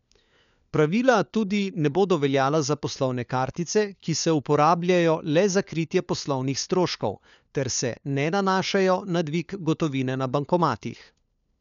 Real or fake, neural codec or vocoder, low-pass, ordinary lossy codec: fake; codec, 16 kHz, 4 kbps, FunCodec, trained on LibriTTS, 50 frames a second; 7.2 kHz; none